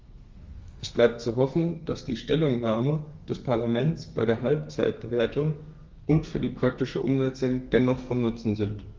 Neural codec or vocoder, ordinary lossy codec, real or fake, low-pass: codec, 32 kHz, 1.9 kbps, SNAC; Opus, 32 kbps; fake; 7.2 kHz